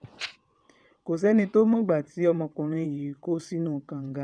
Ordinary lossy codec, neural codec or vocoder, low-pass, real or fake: none; vocoder, 22.05 kHz, 80 mel bands, WaveNeXt; 9.9 kHz; fake